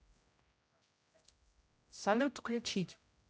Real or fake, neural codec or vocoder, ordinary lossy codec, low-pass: fake; codec, 16 kHz, 0.5 kbps, X-Codec, HuBERT features, trained on general audio; none; none